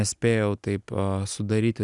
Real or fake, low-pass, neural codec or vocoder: real; 10.8 kHz; none